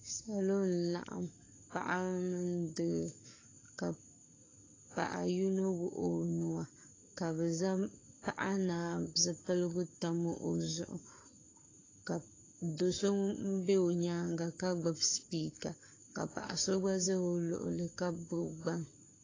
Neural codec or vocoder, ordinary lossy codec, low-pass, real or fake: codec, 44.1 kHz, 7.8 kbps, Pupu-Codec; AAC, 32 kbps; 7.2 kHz; fake